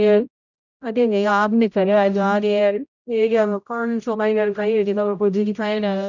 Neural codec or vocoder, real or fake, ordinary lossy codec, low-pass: codec, 16 kHz, 0.5 kbps, X-Codec, HuBERT features, trained on general audio; fake; none; 7.2 kHz